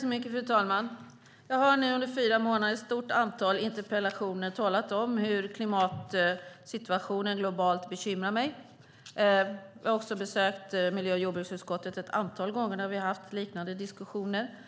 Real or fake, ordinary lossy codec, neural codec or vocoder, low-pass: real; none; none; none